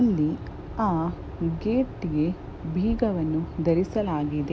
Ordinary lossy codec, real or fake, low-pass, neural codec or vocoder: none; real; none; none